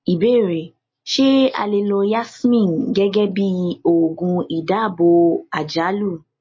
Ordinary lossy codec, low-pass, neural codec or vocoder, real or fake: MP3, 32 kbps; 7.2 kHz; none; real